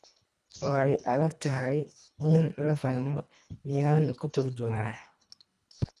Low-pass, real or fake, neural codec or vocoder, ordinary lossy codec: none; fake; codec, 24 kHz, 1.5 kbps, HILCodec; none